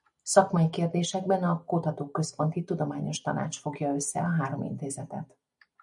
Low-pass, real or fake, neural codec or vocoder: 10.8 kHz; real; none